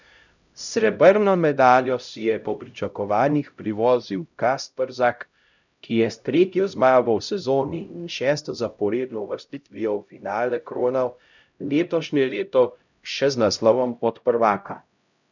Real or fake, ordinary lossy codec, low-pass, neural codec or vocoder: fake; none; 7.2 kHz; codec, 16 kHz, 0.5 kbps, X-Codec, HuBERT features, trained on LibriSpeech